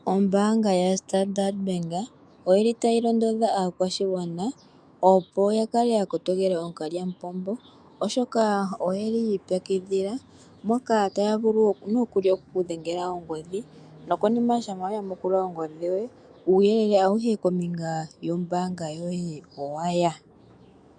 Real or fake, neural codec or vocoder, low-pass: fake; autoencoder, 48 kHz, 128 numbers a frame, DAC-VAE, trained on Japanese speech; 9.9 kHz